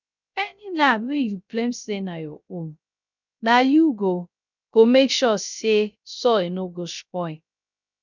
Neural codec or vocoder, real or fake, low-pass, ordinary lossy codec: codec, 16 kHz, 0.3 kbps, FocalCodec; fake; 7.2 kHz; none